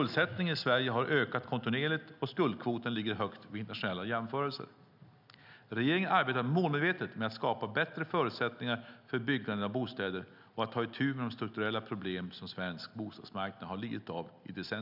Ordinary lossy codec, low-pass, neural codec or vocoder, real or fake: none; 5.4 kHz; none; real